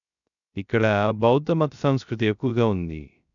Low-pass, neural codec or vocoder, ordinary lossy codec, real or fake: 7.2 kHz; codec, 16 kHz, 0.3 kbps, FocalCodec; none; fake